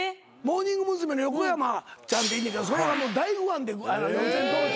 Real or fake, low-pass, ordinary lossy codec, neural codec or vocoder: real; none; none; none